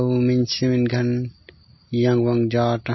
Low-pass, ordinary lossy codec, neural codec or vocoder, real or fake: 7.2 kHz; MP3, 24 kbps; none; real